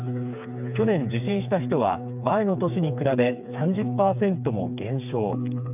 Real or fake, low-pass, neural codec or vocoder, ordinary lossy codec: fake; 3.6 kHz; codec, 16 kHz, 4 kbps, FreqCodec, smaller model; none